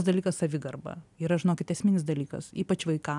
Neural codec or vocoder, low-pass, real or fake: none; 10.8 kHz; real